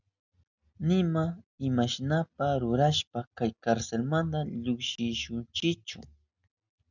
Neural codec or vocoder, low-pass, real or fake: none; 7.2 kHz; real